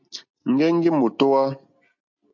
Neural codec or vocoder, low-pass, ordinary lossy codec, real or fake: none; 7.2 kHz; MP3, 48 kbps; real